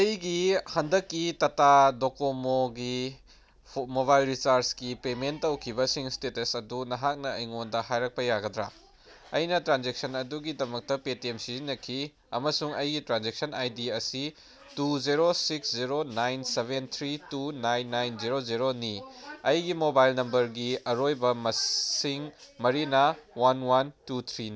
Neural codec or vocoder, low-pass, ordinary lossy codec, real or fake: none; none; none; real